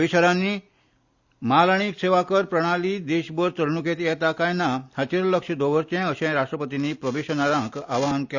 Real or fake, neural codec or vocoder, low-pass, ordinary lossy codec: real; none; 7.2 kHz; Opus, 64 kbps